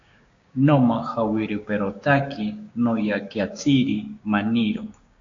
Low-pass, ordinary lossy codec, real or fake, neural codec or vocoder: 7.2 kHz; AAC, 48 kbps; fake; codec, 16 kHz, 6 kbps, DAC